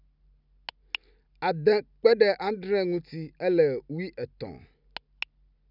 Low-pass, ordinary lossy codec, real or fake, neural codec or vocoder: 5.4 kHz; none; real; none